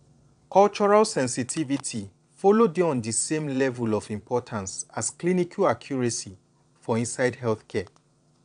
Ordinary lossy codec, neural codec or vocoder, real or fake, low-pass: none; vocoder, 22.05 kHz, 80 mel bands, Vocos; fake; 9.9 kHz